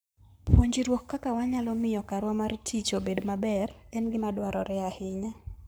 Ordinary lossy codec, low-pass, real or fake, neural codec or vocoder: none; none; fake; codec, 44.1 kHz, 7.8 kbps, Pupu-Codec